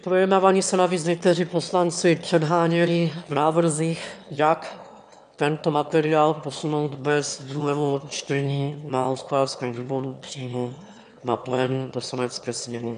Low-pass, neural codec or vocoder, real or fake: 9.9 kHz; autoencoder, 22.05 kHz, a latent of 192 numbers a frame, VITS, trained on one speaker; fake